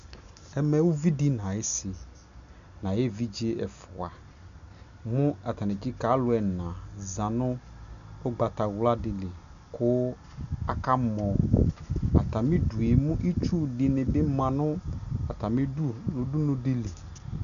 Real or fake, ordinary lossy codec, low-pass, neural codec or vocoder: real; MP3, 96 kbps; 7.2 kHz; none